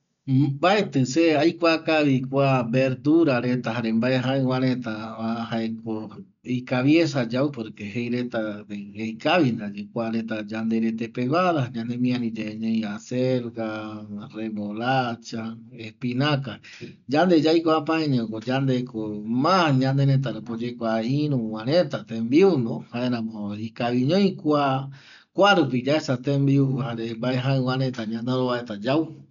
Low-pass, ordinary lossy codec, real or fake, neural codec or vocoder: 7.2 kHz; none; real; none